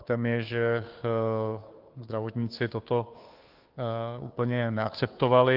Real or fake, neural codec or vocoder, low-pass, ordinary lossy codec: fake; codec, 16 kHz, 2 kbps, FunCodec, trained on Chinese and English, 25 frames a second; 5.4 kHz; Opus, 32 kbps